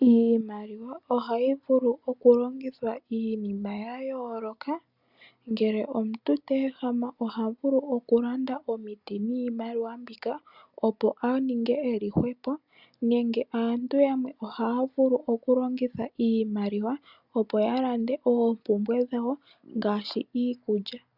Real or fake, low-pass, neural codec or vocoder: real; 5.4 kHz; none